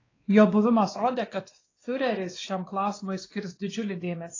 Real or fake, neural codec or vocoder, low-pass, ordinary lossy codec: fake; codec, 16 kHz, 2 kbps, X-Codec, WavLM features, trained on Multilingual LibriSpeech; 7.2 kHz; AAC, 32 kbps